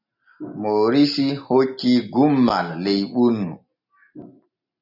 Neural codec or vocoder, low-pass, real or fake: none; 5.4 kHz; real